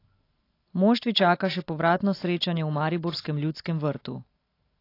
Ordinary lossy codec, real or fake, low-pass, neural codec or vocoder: AAC, 32 kbps; real; 5.4 kHz; none